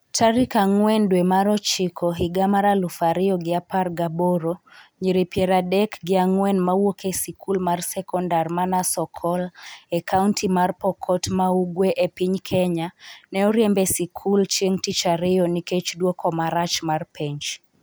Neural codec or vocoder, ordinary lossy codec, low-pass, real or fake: none; none; none; real